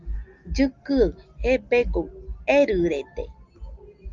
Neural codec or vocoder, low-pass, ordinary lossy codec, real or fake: none; 7.2 kHz; Opus, 32 kbps; real